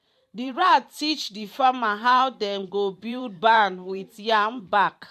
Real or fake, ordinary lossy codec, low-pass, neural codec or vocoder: fake; MP3, 64 kbps; 14.4 kHz; vocoder, 44.1 kHz, 128 mel bands every 512 samples, BigVGAN v2